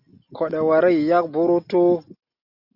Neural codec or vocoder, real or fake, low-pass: none; real; 5.4 kHz